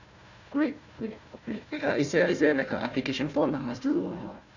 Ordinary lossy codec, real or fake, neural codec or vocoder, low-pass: none; fake; codec, 16 kHz, 1 kbps, FunCodec, trained on Chinese and English, 50 frames a second; 7.2 kHz